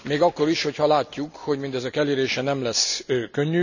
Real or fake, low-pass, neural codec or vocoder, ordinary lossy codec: real; 7.2 kHz; none; none